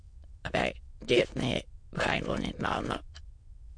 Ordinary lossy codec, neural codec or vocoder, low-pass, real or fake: MP3, 48 kbps; autoencoder, 22.05 kHz, a latent of 192 numbers a frame, VITS, trained on many speakers; 9.9 kHz; fake